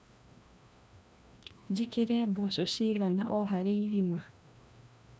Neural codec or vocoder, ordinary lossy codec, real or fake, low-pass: codec, 16 kHz, 1 kbps, FreqCodec, larger model; none; fake; none